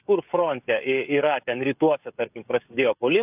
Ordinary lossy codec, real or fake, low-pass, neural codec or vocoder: AAC, 32 kbps; fake; 3.6 kHz; codec, 16 kHz, 16 kbps, FreqCodec, smaller model